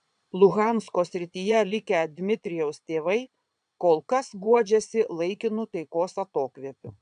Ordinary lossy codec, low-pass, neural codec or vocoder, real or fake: MP3, 96 kbps; 9.9 kHz; vocoder, 22.05 kHz, 80 mel bands, Vocos; fake